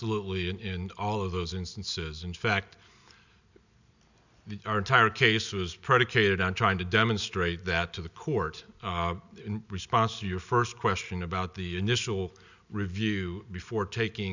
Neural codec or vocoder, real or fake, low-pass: none; real; 7.2 kHz